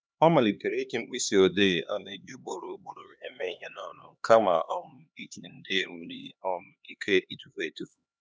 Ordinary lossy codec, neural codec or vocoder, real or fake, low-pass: none; codec, 16 kHz, 2 kbps, X-Codec, HuBERT features, trained on LibriSpeech; fake; none